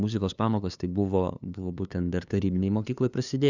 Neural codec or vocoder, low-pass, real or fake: codec, 16 kHz, 2 kbps, FunCodec, trained on LibriTTS, 25 frames a second; 7.2 kHz; fake